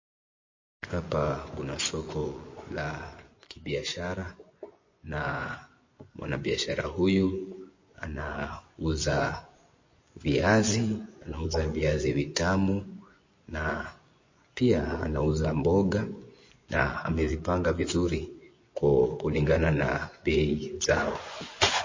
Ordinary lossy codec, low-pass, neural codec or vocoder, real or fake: MP3, 32 kbps; 7.2 kHz; vocoder, 24 kHz, 100 mel bands, Vocos; fake